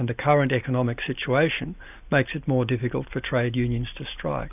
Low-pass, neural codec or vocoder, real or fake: 3.6 kHz; none; real